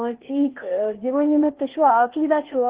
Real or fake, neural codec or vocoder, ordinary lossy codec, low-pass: fake; codec, 16 kHz, 0.8 kbps, ZipCodec; Opus, 16 kbps; 3.6 kHz